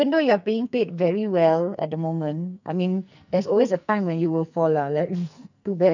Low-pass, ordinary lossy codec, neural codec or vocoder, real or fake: 7.2 kHz; none; codec, 44.1 kHz, 2.6 kbps, SNAC; fake